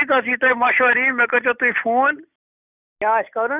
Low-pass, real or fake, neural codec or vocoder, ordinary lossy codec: 3.6 kHz; real; none; none